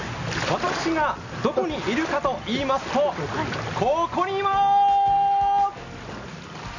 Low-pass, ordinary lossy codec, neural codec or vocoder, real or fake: 7.2 kHz; none; none; real